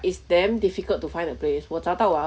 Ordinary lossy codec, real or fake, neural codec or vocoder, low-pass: none; real; none; none